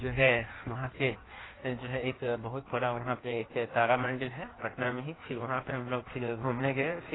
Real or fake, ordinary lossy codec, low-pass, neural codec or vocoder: fake; AAC, 16 kbps; 7.2 kHz; codec, 16 kHz in and 24 kHz out, 1.1 kbps, FireRedTTS-2 codec